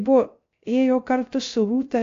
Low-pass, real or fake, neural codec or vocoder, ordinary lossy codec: 7.2 kHz; fake; codec, 16 kHz, 0.3 kbps, FocalCodec; AAC, 48 kbps